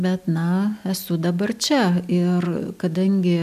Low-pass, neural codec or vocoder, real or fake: 14.4 kHz; none; real